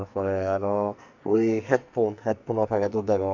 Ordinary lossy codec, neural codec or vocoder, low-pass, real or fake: none; codec, 44.1 kHz, 2.6 kbps, SNAC; 7.2 kHz; fake